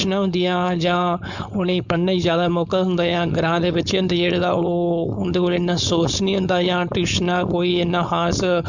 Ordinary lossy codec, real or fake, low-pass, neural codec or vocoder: none; fake; 7.2 kHz; codec, 16 kHz, 4.8 kbps, FACodec